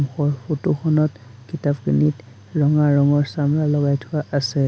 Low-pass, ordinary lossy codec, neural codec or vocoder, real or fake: none; none; none; real